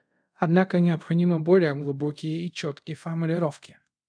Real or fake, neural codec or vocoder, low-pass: fake; codec, 24 kHz, 0.5 kbps, DualCodec; 9.9 kHz